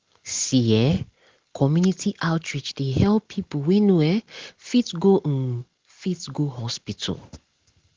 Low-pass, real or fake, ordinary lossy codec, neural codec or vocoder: 7.2 kHz; real; Opus, 16 kbps; none